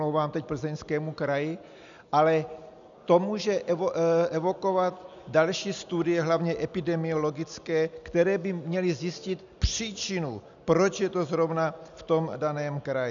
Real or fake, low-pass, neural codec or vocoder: real; 7.2 kHz; none